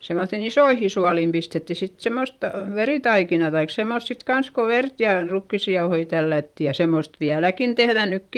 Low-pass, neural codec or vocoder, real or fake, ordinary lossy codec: 19.8 kHz; vocoder, 44.1 kHz, 128 mel bands, Pupu-Vocoder; fake; Opus, 32 kbps